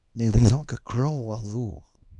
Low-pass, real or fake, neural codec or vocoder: 10.8 kHz; fake; codec, 24 kHz, 0.9 kbps, WavTokenizer, small release